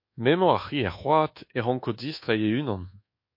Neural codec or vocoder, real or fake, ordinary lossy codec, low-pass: codec, 24 kHz, 1.2 kbps, DualCodec; fake; MP3, 32 kbps; 5.4 kHz